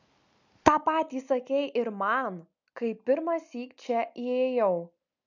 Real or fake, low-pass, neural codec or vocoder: real; 7.2 kHz; none